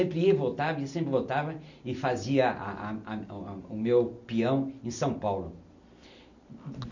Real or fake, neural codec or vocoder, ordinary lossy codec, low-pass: real; none; none; 7.2 kHz